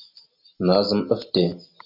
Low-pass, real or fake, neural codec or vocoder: 5.4 kHz; real; none